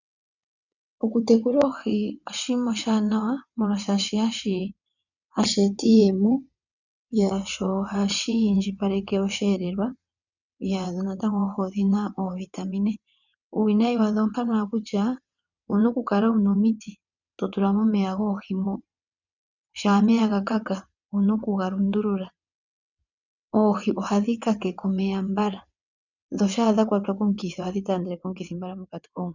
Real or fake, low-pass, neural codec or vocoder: fake; 7.2 kHz; vocoder, 22.05 kHz, 80 mel bands, WaveNeXt